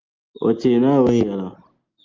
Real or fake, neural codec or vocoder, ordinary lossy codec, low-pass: real; none; Opus, 32 kbps; 7.2 kHz